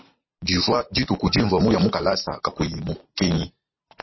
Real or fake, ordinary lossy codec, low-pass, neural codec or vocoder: real; MP3, 24 kbps; 7.2 kHz; none